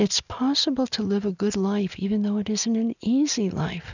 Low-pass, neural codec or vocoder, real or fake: 7.2 kHz; none; real